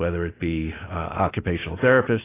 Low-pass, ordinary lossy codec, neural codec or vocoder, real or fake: 3.6 kHz; AAC, 16 kbps; none; real